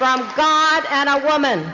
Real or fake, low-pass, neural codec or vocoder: real; 7.2 kHz; none